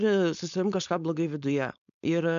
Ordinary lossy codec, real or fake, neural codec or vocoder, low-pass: MP3, 96 kbps; fake; codec, 16 kHz, 4.8 kbps, FACodec; 7.2 kHz